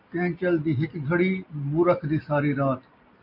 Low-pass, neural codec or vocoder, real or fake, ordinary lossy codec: 5.4 kHz; none; real; MP3, 48 kbps